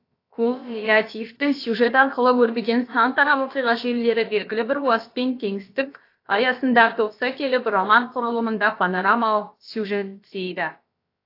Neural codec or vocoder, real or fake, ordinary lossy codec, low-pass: codec, 16 kHz, about 1 kbps, DyCAST, with the encoder's durations; fake; AAC, 32 kbps; 5.4 kHz